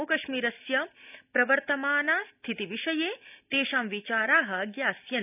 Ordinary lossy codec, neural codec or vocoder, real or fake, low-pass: none; none; real; 3.6 kHz